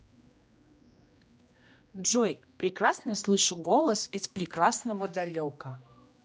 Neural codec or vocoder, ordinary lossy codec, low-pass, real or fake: codec, 16 kHz, 1 kbps, X-Codec, HuBERT features, trained on general audio; none; none; fake